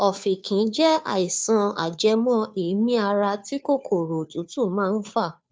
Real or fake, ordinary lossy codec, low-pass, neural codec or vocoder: fake; none; none; codec, 16 kHz, 2 kbps, FunCodec, trained on Chinese and English, 25 frames a second